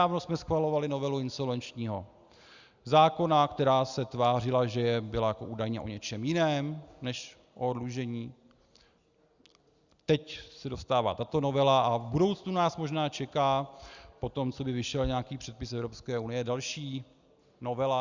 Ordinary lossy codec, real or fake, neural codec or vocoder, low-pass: Opus, 64 kbps; real; none; 7.2 kHz